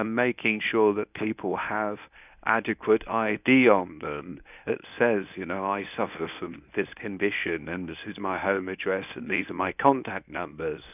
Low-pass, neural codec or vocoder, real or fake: 3.6 kHz; codec, 24 kHz, 0.9 kbps, WavTokenizer, medium speech release version 1; fake